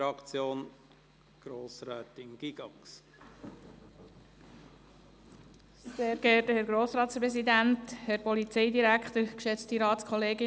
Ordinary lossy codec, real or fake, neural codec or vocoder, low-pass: none; real; none; none